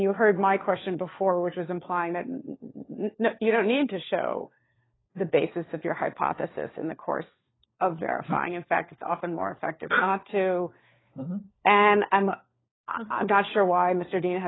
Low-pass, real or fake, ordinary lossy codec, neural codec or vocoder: 7.2 kHz; fake; AAC, 16 kbps; codec, 16 kHz, 4 kbps, FunCodec, trained on LibriTTS, 50 frames a second